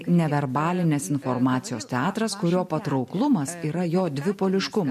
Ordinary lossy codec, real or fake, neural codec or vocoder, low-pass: MP3, 64 kbps; real; none; 14.4 kHz